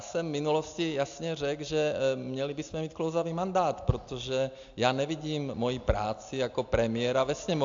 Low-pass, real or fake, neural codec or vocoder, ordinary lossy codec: 7.2 kHz; real; none; AAC, 64 kbps